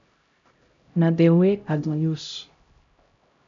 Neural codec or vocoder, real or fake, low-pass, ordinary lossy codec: codec, 16 kHz, 0.5 kbps, X-Codec, HuBERT features, trained on LibriSpeech; fake; 7.2 kHz; MP3, 64 kbps